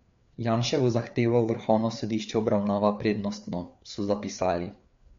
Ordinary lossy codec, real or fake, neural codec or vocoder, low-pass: MP3, 48 kbps; fake; codec, 16 kHz, 4 kbps, FreqCodec, larger model; 7.2 kHz